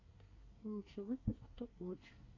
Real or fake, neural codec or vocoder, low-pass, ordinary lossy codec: fake; codec, 24 kHz, 1 kbps, SNAC; 7.2 kHz; AAC, 32 kbps